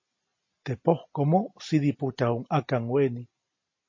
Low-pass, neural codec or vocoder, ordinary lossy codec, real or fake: 7.2 kHz; none; MP3, 32 kbps; real